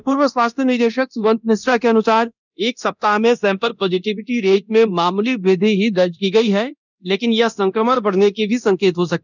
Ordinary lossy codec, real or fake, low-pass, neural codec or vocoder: none; fake; 7.2 kHz; codec, 24 kHz, 0.9 kbps, DualCodec